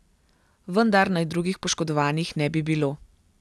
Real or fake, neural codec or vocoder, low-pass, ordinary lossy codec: real; none; none; none